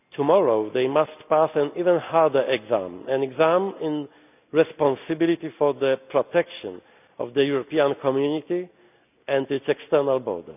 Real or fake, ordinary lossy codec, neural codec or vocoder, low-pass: real; none; none; 3.6 kHz